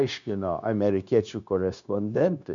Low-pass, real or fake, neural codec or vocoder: 7.2 kHz; fake; codec, 16 kHz, 0.9 kbps, LongCat-Audio-Codec